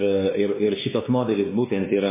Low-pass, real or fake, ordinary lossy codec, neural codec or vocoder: 3.6 kHz; fake; MP3, 16 kbps; codec, 16 kHz, 2 kbps, X-Codec, WavLM features, trained on Multilingual LibriSpeech